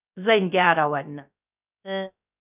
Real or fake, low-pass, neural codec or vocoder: fake; 3.6 kHz; codec, 16 kHz, 0.3 kbps, FocalCodec